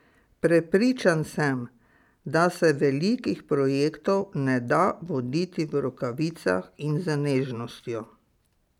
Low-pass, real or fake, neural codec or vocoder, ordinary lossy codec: 19.8 kHz; real; none; none